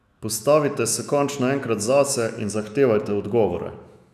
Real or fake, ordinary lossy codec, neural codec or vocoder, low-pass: fake; none; autoencoder, 48 kHz, 128 numbers a frame, DAC-VAE, trained on Japanese speech; 14.4 kHz